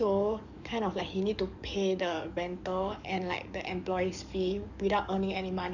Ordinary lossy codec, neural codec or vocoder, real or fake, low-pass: none; codec, 44.1 kHz, 7.8 kbps, DAC; fake; 7.2 kHz